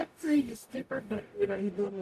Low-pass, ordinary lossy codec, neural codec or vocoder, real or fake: 14.4 kHz; MP3, 96 kbps; codec, 44.1 kHz, 0.9 kbps, DAC; fake